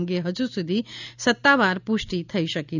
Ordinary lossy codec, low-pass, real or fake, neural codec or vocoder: none; 7.2 kHz; real; none